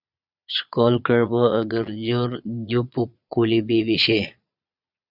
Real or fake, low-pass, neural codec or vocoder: fake; 5.4 kHz; vocoder, 22.05 kHz, 80 mel bands, Vocos